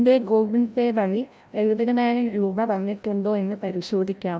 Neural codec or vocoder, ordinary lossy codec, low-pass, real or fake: codec, 16 kHz, 0.5 kbps, FreqCodec, larger model; none; none; fake